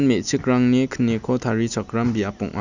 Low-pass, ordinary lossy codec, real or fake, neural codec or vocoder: 7.2 kHz; none; real; none